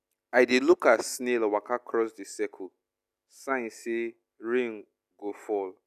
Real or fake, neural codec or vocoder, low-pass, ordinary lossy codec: real; none; 14.4 kHz; none